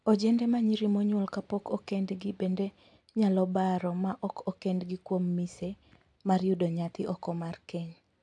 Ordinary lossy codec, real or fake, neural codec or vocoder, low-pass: AAC, 64 kbps; real; none; 10.8 kHz